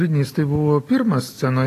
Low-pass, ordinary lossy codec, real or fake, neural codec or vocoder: 14.4 kHz; AAC, 48 kbps; real; none